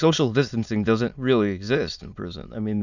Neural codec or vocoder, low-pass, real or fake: autoencoder, 22.05 kHz, a latent of 192 numbers a frame, VITS, trained on many speakers; 7.2 kHz; fake